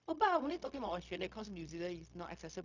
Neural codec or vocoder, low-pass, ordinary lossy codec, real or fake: codec, 16 kHz, 0.4 kbps, LongCat-Audio-Codec; 7.2 kHz; none; fake